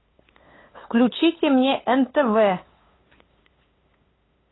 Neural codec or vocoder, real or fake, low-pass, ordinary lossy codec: codec, 16 kHz, 8 kbps, FunCodec, trained on LibriTTS, 25 frames a second; fake; 7.2 kHz; AAC, 16 kbps